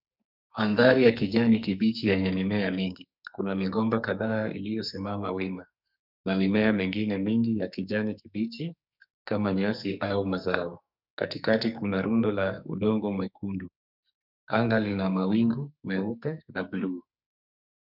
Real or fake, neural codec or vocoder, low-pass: fake; codec, 44.1 kHz, 2.6 kbps, SNAC; 5.4 kHz